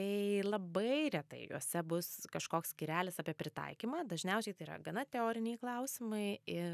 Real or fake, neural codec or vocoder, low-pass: real; none; 14.4 kHz